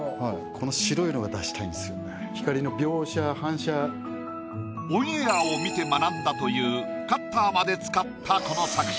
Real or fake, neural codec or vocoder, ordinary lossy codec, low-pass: real; none; none; none